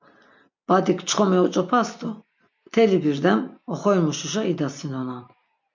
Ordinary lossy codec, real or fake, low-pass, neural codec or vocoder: MP3, 64 kbps; real; 7.2 kHz; none